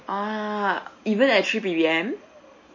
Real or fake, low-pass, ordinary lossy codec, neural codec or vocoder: real; 7.2 kHz; MP3, 32 kbps; none